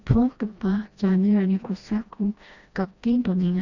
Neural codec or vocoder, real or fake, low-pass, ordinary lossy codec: codec, 16 kHz, 1 kbps, FreqCodec, smaller model; fake; 7.2 kHz; none